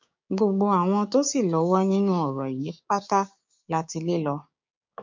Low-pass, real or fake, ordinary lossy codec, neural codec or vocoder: 7.2 kHz; fake; MP3, 48 kbps; codec, 16 kHz, 6 kbps, DAC